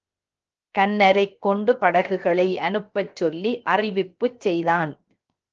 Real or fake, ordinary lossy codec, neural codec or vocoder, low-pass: fake; Opus, 24 kbps; codec, 16 kHz, 0.7 kbps, FocalCodec; 7.2 kHz